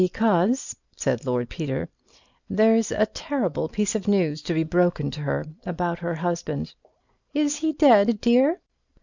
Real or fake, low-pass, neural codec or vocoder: real; 7.2 kHz; none